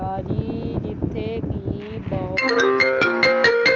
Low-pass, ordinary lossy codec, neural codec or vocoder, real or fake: 7.2 kHz; Opus, 32 kbps; none; real